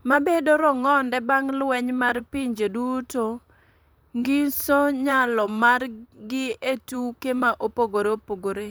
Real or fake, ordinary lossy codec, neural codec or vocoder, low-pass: fake; none; vocoder, 44.1 kHz, 128 mel bands, Pupu-Vocoder; none